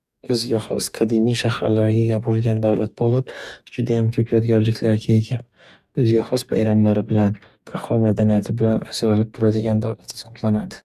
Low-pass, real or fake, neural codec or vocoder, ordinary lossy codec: 14.4 kHz; fake; codec, 44.1 kHz, 2.6 kbps, DAC; none